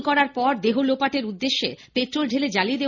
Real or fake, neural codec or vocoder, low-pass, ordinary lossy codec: real; none; 7.2 kHz; none